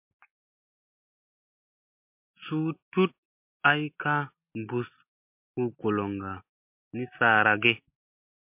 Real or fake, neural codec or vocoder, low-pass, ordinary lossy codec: real; none; 3.6 kHz; MP3, 32 kbps